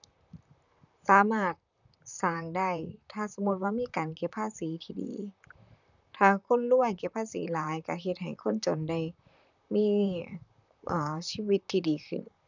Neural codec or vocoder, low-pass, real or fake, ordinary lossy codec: vocoder, 44.1 kHz, 128 mel bands, Pupu-Vocoder; 7.2 kHz; fake; none